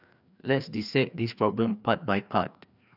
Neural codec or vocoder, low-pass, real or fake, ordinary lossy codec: codec, 16 kHz, 2 kbps, FreqCodec, larger model; 5.4 kHz; fake; none